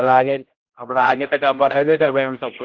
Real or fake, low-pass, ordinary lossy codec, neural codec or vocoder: fake; 7.2 kHz; Opus, 16 kbps; codec, 16 kHz, 0.5 kbps, X-Codec, HuBERT features, trained on general audio